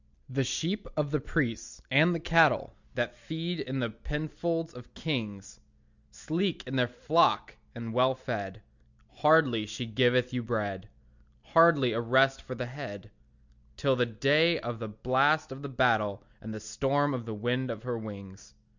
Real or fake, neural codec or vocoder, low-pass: real; none; 7.2 kHz